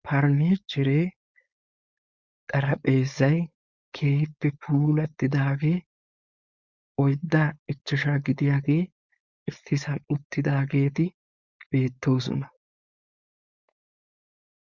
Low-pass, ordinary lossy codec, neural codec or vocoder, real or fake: 7.2 kHz; Opus, 64 kbps; codec, 16 kHz, 4.8 kbps, FACodec; fake